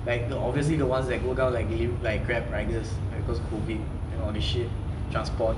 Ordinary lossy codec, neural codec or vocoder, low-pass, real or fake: MP3, 96 kbps; none; 10.8 kHz; real